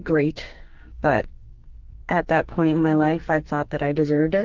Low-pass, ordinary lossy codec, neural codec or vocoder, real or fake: 7.2 kHz; Opus, 24 kbps; codec, 44.1 kHz, 2.6 kbps, SNAC; fake